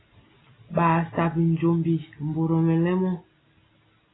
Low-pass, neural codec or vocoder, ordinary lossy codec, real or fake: 7.2 kHz; none; AAC, 16 kbps; real